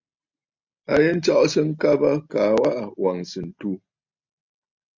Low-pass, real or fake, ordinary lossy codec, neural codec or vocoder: 7.2 kHz; real; MP3, 64 kbps; none